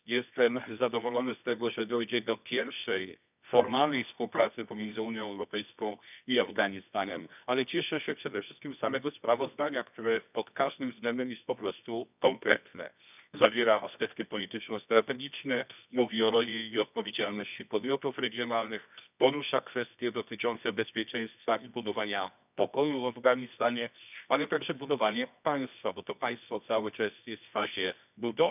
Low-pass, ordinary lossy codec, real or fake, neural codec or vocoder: 3.6 kHz; none; fake; codec, 24 kHz, 0.9 kbps, WavTokenizer, medium music audio release